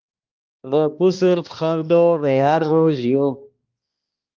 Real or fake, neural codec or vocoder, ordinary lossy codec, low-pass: fake; codec, 16 kHz, 1 kbps, X-Codec, HuBERT features, trained on balanced general audio; Opus, 24 kbps; 7.2 kHz